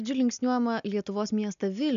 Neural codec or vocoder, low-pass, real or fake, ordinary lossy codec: none; 7.2 kHz; real; MP3, 96 kbps